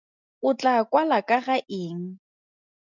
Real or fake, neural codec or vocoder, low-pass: real; none; 7.2 kHz